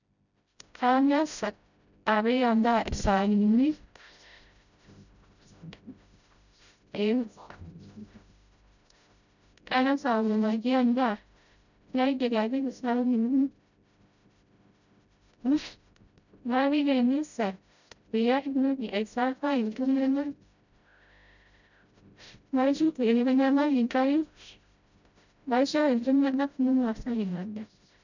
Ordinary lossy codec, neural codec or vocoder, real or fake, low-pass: none; codec, 16 kHz, 0.5 kbps, FreqCodec, smaller model; fake; 7.2 kHz